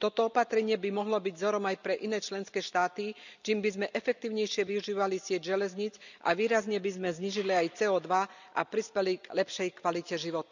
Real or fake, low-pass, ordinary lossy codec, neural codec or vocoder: real; 7.2 kHz; none; none